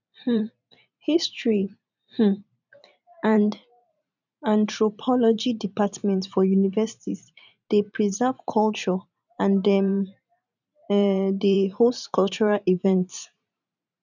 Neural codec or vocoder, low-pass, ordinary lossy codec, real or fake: vocoder, 44.1 kHz, 128 mel bands every 512 samples, BigVGAN v2; 7.2 kHz; none; fake